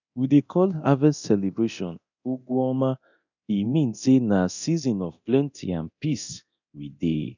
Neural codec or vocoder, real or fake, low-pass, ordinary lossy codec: codec, 24 kHz, 0.9 kbps, DualCodec; fake; 7.2 kHz; none